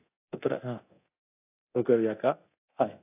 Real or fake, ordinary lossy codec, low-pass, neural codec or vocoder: fake; AAC, 24 kbps; 3.6 kHz; codec, 24 kHz, 0.9 kbps, DualCodec